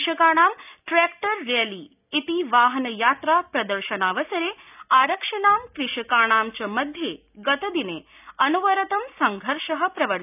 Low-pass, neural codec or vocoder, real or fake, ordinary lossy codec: 3.6 kHz; none; real; none